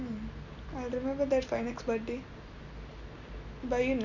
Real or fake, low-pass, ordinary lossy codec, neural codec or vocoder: real; 7.2 kHz; none; none